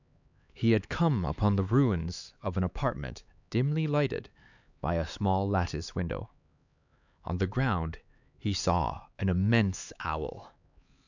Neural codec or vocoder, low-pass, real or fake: codec, 16 kHz, 4 kbps, X-Codec, HuBERT features, trained on LibriSpeech; 7.2 kHz; fake